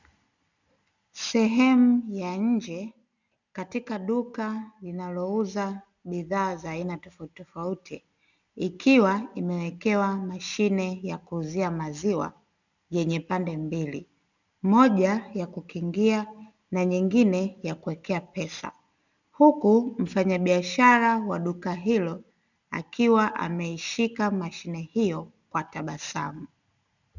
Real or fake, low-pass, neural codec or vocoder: real; 7.2 kHz; none